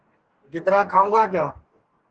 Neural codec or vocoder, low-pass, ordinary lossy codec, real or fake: codec, 44.1 kHz, 2.6 kbps, DAC; 9.9 kHz; Opus, 16 kbps; fake